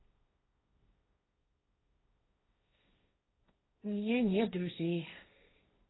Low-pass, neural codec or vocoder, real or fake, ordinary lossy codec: 7.2 kHz; codec, 16 kHz, 1.1 kbps, Voila-Tokenizer; fake; AAC, 16 kbps